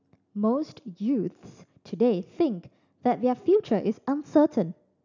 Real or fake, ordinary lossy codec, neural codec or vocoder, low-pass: real; none; none; 7.2 kHz